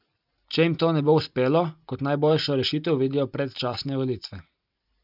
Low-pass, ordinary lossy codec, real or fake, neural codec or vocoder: 5.4 kHz; none; real; none